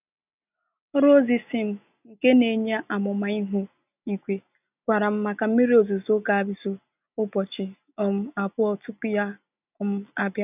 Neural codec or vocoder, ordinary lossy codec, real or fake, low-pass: none; none; real; 3.6 kHz